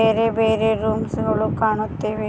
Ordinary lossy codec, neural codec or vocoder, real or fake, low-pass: none; none; real; none